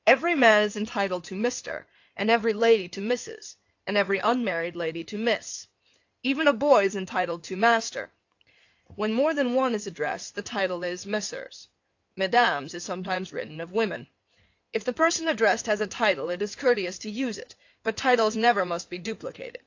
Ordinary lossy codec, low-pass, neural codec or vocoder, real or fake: AAC, 48 kbps; 7.2 kHz; codec, 16 kHz in and 24 kHz out, 2.2 kbps, FireRedTTS-2 codec; fake